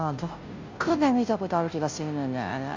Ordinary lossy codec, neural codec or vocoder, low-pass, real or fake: MP3, 48 kbps; codec, 16 kHz, 0.5 kbps, FunCodec, trained on Chinese and English, 25 frames a second; 7.2 kHz; fake